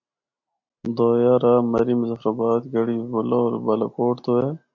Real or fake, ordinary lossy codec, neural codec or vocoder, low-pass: real; MP3, 64 kbps; none; 7.2 kHz